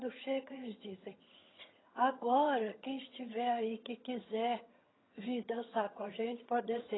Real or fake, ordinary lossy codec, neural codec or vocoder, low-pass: fake; AAC, 16 kbps; vocoder, 22.05 kHz, 80 mel bands, HiFi-GAN; 7.2 kHz